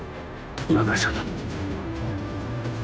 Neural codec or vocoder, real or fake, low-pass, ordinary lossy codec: codec, 16 kHz, 0.5 kbps, FunCodec, trained on Chinese and English, 25 frames a second; fake; none; none